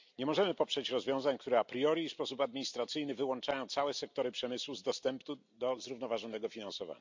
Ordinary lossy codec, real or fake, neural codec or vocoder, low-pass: MP3, 64 kbps; real; none; 7.2 kHz